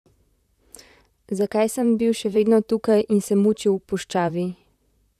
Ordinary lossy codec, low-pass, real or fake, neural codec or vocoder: none; 14.4 kHz; fake; vocoder, 44.1 kHz, 128 mel bands, Pupu-Vocoder